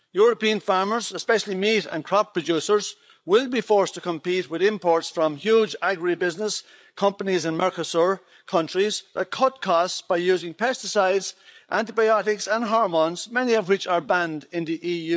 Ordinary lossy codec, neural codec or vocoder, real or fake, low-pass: none; codec, 16 kHz, 8 kbps, FreqCodec, larger model; fake; none